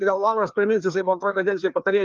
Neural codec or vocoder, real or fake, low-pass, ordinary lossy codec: codec, 16 kHz, 2 kbps, FreqCodec, larger model; fake; 7.2 kHz; Opus, 32 kbps